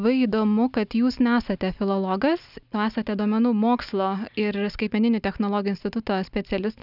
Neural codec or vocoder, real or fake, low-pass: none; real; 5.4 kHz